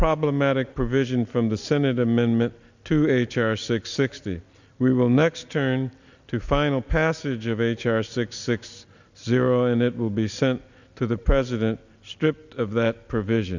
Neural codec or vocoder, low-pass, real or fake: none; 7.2 kHz; real